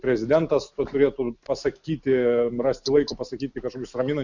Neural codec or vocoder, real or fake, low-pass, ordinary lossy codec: none; real; 7.2 kHz; Opus, 64 kbps